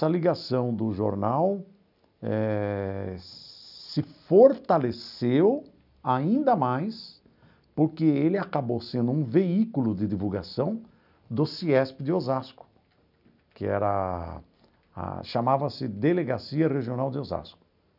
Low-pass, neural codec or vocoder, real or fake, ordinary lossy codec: 5.4 kHz; none; real; none